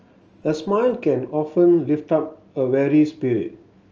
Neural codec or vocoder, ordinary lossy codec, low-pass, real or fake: none; Opus, 24 kbps; 7.2 kHz; real